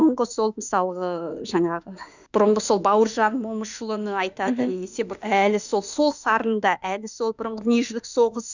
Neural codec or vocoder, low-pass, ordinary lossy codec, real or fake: autoencoder, 48 kHz, 32 numbers a frame, DAC-VAE, trained on Japanese speech; 7.2 kHz; none; fake